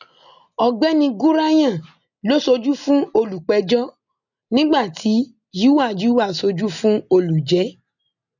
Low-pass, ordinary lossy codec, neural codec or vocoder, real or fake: 7.2 kHz; none; none; real